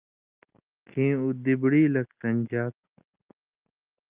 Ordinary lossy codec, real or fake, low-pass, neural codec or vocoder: Opus, 24 kbps; real; 3.6 kHz; none